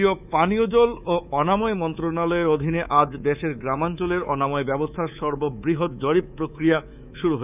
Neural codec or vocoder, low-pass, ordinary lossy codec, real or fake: codec, 24 kHz, 3.1 kbps, DualCodec; 3.6 kHz; none; fake